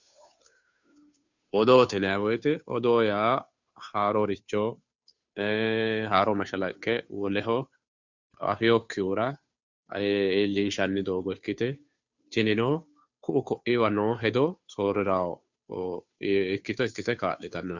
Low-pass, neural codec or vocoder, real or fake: 7.2 kHz; codec, 16 kHz, 2 kbps, FunCodec, trained on Chinese and English, 25 frames a second; fake